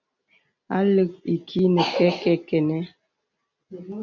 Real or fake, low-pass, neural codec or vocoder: real; 7.2 kHz; none